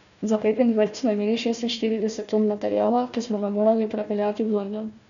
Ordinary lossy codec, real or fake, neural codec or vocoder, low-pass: none; fake; codec, 16 kHz, 1 kbps, FunCodec, trained on Chinese and English, 50 frames a second; 7.2 kHz